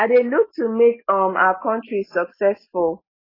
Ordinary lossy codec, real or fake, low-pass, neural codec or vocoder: AAC, 24 kbps; real; 5.4 kHz; none